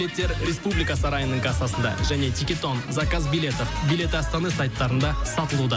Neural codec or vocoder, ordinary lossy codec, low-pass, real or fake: none; none; none; real